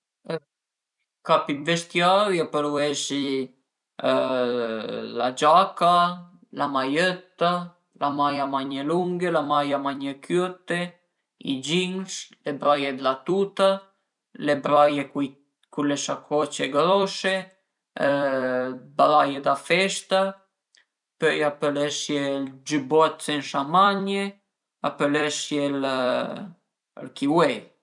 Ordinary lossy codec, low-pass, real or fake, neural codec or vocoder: none; 10.8 kHz; fake; vocoder, 44.1 kHz, 128 mel bands every 256 samples, BigVGAN v2